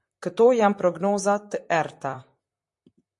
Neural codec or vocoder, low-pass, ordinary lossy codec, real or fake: vocoder, 44.1 kHz, 128 mel bands, Pupu-Vocoder; 10.8 kHz; MP3, 48 kbps; fake